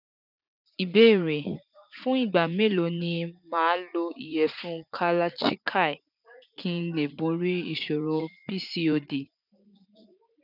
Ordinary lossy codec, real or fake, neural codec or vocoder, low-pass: none; fake; vocoder, 44.1 kHz, 80 mel bands, Vocos; 5.4 kHz